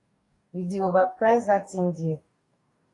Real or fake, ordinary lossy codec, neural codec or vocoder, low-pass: fake; AAC, 48 kbps; codec, 44.1 kHz, 2.6 kbps, DAC; 10.8 kHz